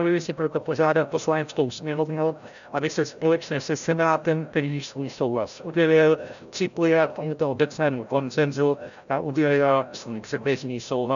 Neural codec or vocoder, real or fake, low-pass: codec, 16 kHz, 0.5 kbps, FreqCodec, larger model; fake; 7.2 kHz